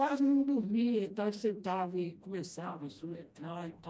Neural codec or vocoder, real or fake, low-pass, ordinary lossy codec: codec, 16 kHz, 1 kbps, FreqCodec, smaller model; fake; none; none